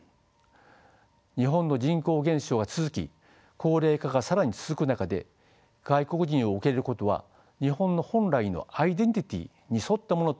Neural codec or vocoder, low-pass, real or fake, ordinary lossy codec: none; none; real; none